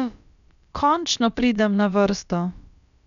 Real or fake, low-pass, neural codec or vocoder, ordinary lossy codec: fake; 7.2 kHz; codec, 16 kHz, about 1 kbps, DyCAST, with the encoder's durations; none